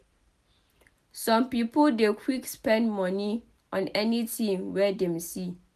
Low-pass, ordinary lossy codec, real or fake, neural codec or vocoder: 14.4 kHz; none; real; none